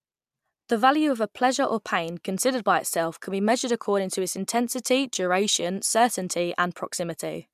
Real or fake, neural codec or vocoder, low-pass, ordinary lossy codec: real; none; 14.4 kHz; MP3, 96 kbps